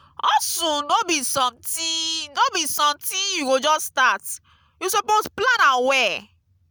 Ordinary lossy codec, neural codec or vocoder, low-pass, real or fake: none; none; none; real